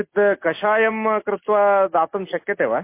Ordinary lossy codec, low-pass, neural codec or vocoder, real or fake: MP3, 24 kbps; 3.6 kHz; none; real